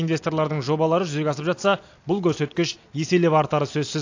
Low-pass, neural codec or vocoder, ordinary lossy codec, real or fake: 7.2 kHz; none; AAC, 48 kbps; real